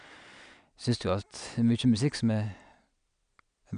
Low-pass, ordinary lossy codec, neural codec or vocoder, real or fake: 9.9 kHz; none; none; real